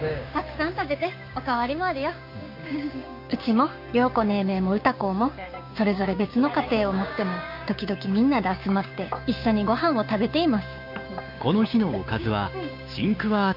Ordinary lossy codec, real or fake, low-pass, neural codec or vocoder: none; fake; 5.4 kHz; codec, 16 kHz, 6 kbps, DAC